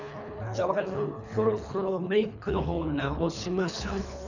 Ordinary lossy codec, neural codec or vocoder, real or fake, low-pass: none; codec, 24 kHz, 3 kbps, HILCodec; fake; 7.2 kHz